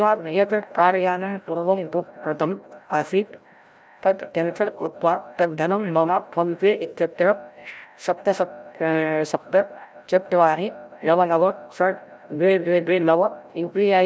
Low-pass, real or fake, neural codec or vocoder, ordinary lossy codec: none; fake; codec, 16 kHz, 0.5 kbps, FreqCodec, larger model; none